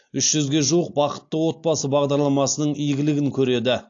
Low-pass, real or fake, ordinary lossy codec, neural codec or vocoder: 7.2 kHz; real; AAC, 48 kbps; none